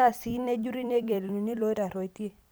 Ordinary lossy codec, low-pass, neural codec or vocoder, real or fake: none; none; vocoder, 44.1 kHz, 128 mel bands every 256 samples, BigVGAN v2; fake